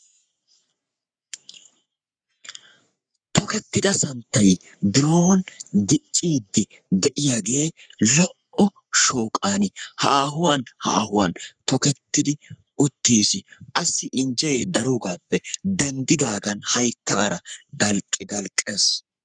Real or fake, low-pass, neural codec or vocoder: fake; 9.9 kHz; codec, 44.1 kHz, 3.4 kbps, Pupu-Codec